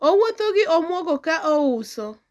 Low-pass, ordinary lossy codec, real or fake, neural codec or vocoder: none; none; real; none